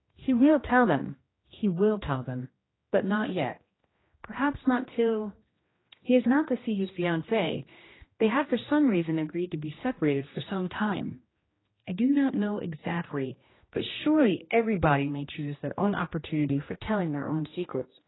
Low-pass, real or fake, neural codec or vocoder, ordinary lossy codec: 7.2 kHz; fake; codec, 16 kHz, 1 kbps, X-Codec, HuBERT features, trained on general audio; AAC, 16 kbps